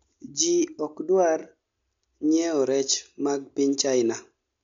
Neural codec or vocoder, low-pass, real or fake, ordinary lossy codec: none; 7.2 kHz; real; MP3, 64 kbps